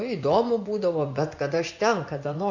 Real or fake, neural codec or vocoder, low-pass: fake; vocoder, 24 kHz, 100 mel bands, Vocos; 7.2 kHz